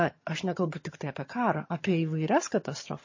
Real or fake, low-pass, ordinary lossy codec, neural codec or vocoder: fake; 7.2 kHz; MP3, 32 kbps; vocoder, 22.05 kHz, 80 mel bands, HiFi-GAN